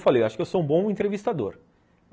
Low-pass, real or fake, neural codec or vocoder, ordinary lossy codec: none; real; none; none